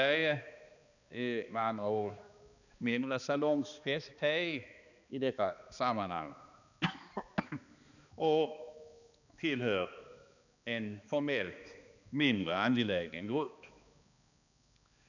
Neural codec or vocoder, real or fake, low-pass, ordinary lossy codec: codec, 16 kHz, 2 kbps, X-Codec, HuBERT features, trained on balanced general audio; fake; 7.2 kHz; none